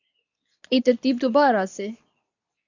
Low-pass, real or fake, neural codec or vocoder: 7.2 kHz; fake; codec, 24 kHz, 0.9 kbps, WavTokenizer, medium speech release version 2